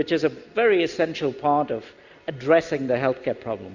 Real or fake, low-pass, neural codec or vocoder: real; 7.2 kHz; none